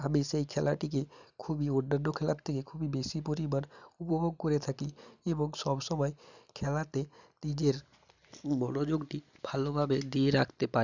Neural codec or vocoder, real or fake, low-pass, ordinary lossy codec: none; real; 7.2 kHz; none